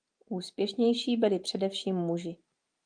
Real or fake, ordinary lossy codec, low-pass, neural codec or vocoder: real; Opus, 32 kbps; 9.9 kHz; none